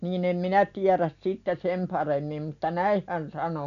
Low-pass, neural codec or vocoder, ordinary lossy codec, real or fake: 7.2 kHz; none; none; real